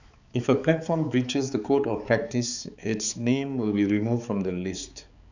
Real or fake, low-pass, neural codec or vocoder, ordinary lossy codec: fake; 7.2 kHz; codec, 16 kHz, 4 kbps, X-Codec, HuBERT features, trained on balanced general audio; none